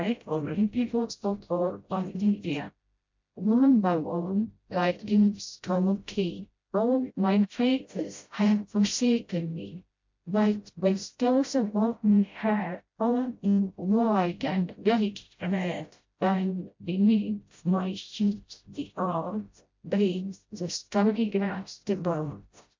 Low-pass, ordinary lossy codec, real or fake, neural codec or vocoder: 7.2 kHz; MP3, 48 kbps; fake; codec, 16 kHz, 0.5 kbps, FreqCodec, smaller model